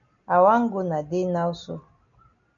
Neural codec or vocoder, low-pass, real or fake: none; 7.2 kHz; real